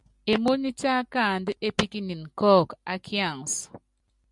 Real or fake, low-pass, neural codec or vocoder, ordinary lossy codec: real; 10.8 kHz; none; MP3, 96 kbps